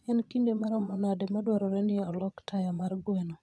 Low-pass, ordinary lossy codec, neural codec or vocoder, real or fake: none; none; vocoder, 22.05 kHz, 80 mel bands, Vocos; fake